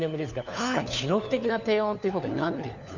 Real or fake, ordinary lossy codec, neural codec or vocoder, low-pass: fake; none; codec, 16 kHz, 4 kbps, FunCodec, trained on LibriTTS, 50 frames a second; 7.2 kHz